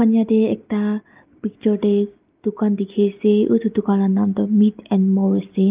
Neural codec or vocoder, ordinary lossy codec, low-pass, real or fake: none; Opus, 32 kbps; 3.6 kHz; real